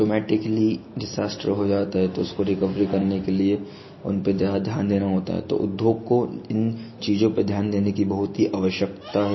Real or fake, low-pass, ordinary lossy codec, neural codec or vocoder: real; 7.2 kHz; MP3, 24 kbps; none